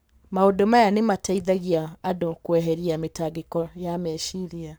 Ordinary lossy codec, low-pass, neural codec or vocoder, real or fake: none; none; codec, 44.1 kHz, 7.8 kbps, Pupu-Codec; fake